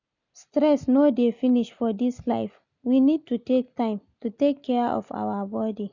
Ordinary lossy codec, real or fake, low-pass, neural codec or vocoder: none; real; 7.2 kHz; none